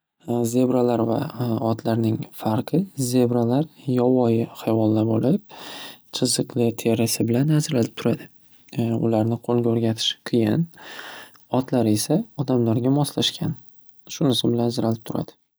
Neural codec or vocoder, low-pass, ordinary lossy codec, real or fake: none; none; none; real